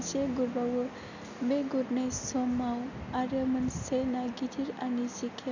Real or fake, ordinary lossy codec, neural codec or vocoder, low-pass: real; none; none; 7.2 kHz